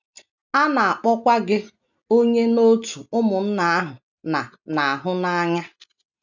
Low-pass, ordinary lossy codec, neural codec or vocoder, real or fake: 7.2 kHz; none; none; real